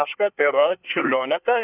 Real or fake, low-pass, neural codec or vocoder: fake; 3.6 kHz; codec, 24 kHz, 1 kbps, SNAC